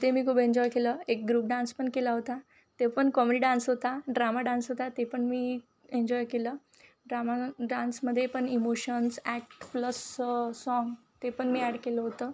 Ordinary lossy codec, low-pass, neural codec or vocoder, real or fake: none; none; none; real